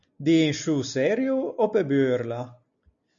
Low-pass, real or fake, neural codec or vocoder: 7.2 kHz; real; none